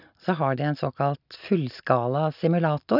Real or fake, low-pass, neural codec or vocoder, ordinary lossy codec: real; 5.4 kHz; none; none